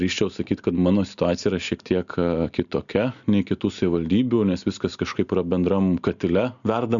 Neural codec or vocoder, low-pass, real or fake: none; 7.2 kHz; real